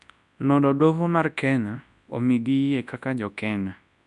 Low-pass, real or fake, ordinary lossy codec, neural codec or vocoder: 10.8 kHz; fake; none; codec, 24 kHz, 0.9 kbps, WavTokenizer, large speech release